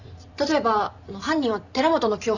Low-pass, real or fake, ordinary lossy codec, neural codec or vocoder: 7.2 kHz; real; none; none